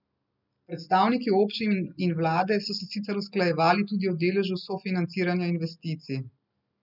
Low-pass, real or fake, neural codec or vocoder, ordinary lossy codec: 5.4 kHz; real; none; none